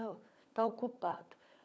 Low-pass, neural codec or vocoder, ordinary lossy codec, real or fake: none; codec, 16 kHz, 16 kbps, FunCodec, trained on LibriTTS, 50 frames a second; none; fake